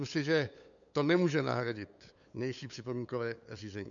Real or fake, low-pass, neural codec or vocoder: fake; 7.2 kHz; codec, 16 kHz, 8 kbps, FunCodec, trained on LibriTTS, 25 frames a second